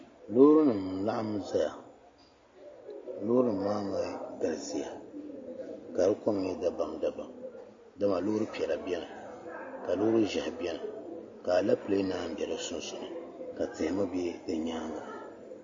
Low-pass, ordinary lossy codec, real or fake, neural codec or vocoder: 7.2 kHz; MP3, 32 kbps; real; none